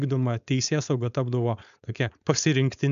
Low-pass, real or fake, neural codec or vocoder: 7.2 kHz; fake; codec, 16 kHz, 4.8 kbps, FACodec